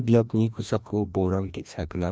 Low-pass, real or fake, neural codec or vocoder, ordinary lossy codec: none; fake; codec, 16 kHz, 1 kbps, FreqCodec, larger model; none